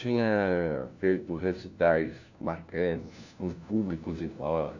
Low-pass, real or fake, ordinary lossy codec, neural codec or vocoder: 7.2 kHz; fake; none; codec, 16 kHz, 1 kbps, FunCodec, trained on LibriTTS, 50 frames a second